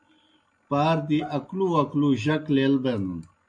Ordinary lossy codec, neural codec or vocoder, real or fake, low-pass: AAC, 64 kbps; none; real; 9.9 kHz